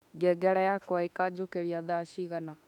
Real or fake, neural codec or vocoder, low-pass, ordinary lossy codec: fake; autoencoder, 48 kHz, 32 numbers a frame, DAC-VAE, trained on Japanese speech; 19.8 kHz; none